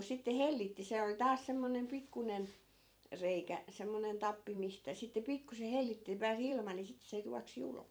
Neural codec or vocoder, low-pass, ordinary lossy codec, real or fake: vocoder, 44.1 kHz, 128 mel bands every 256 samples, BigVGAN v2; none; none; fake